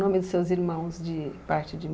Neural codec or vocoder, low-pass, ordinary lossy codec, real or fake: none; none; none; real